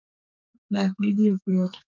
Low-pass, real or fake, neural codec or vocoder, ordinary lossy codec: 7.2 kHz; fake; codec, 32 kHz, 1.9 kbps, SNAC; MP3, 64 kbps